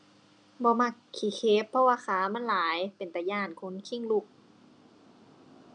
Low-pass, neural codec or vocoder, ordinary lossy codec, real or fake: 9.9 kHz; none; none; real